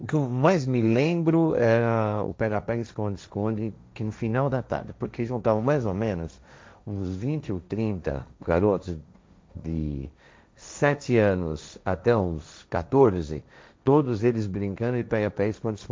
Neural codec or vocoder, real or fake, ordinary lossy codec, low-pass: codec, 16 kHz, 1.1 kbps, Voila-Tokenizer; fake; none; none